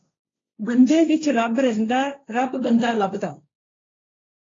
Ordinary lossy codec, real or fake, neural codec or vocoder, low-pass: AAC, 32 kbps; fake; codec, 16 kHz, 1.1 kbps, Voila-Tokenizer; 7.2 kHz